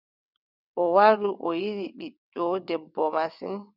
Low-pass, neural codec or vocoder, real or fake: 5.4 kHz; vocoder, 22.05 kHz, 80 mel bands, WaveNeXt; fake